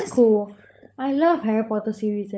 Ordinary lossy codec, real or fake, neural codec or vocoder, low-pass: none; fake; codec, 16 kHz, 16 kbps, FunCodec, trained on LibriTTS, 50 frames a second; none